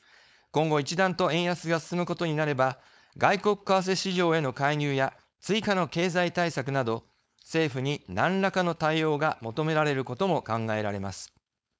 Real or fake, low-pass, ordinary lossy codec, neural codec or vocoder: fake; none; none; codec, 16 kHz, 4.8 kbps, FACodec